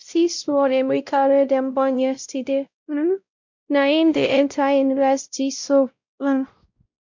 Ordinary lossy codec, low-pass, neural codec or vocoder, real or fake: MP3, 64 kbps; 7.2 kHz; codec, 16 kHz, 0.5 kbps, X-Codec, WavLM features, trained on Multilingual LibriSpeech; fake